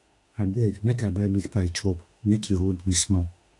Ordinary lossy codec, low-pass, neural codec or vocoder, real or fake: AAC, 48 kbps; 10.8 kHz; autoencoder, 48 kHz, 32 numbers a frame, DAC-VAE, trained on Japanese speech; fake